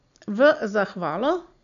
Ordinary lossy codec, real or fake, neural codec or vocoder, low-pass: none; real; none; 7.2 kHz